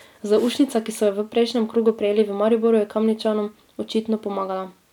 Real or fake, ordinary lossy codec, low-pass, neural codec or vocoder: real; none; 19.8 kHz; none